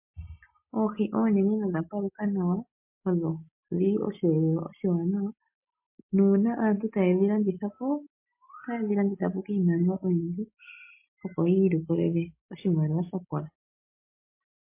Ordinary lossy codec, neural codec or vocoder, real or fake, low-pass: MP3, 24 kbps; none; real; 3.6 kHz